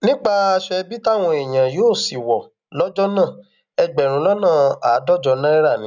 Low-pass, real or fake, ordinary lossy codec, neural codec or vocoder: 7.2 kHz; real; none; none